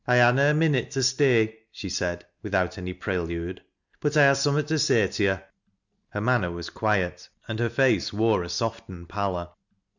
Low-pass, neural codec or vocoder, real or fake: 7.2 kHz; none; real